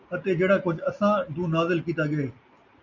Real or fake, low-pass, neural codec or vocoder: real; 7.2 kHz; none